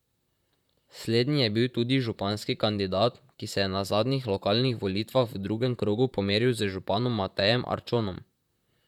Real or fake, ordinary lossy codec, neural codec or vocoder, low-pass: fake; none; vocoder, 44.1 kHz, 128 mel bands, Pupu-Vocoder; 19.8 kHz